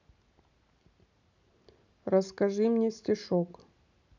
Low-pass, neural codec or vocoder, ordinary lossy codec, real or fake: 7.2 kHz; none; none; real